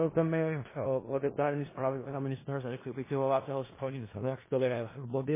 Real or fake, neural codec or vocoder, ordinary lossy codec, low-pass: fake; codec, 16 kHz in and 24 kHz out, 0.4 kbps, LongCat-Audio-Codec, four codebook decoder; MP3, 16 kbps; 3.6 kHz